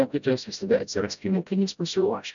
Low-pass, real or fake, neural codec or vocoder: 7.2 kHz; fake; codec, 16 kHz, 0.5 kbps, FreqCodec, smaller model